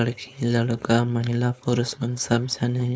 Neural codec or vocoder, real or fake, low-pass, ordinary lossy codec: codec, 16 kHz, 4.8 kbps, FACodec; fake; none; none